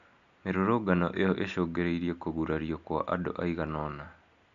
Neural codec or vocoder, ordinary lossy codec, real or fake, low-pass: none; none; real; 7.2 kHz